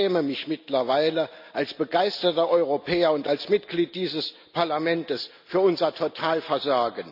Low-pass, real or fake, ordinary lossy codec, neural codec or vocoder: 5.4 kHz; real; none; none